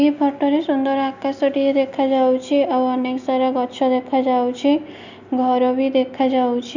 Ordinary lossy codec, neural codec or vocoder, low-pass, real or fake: none; none; 7.2 kHz; real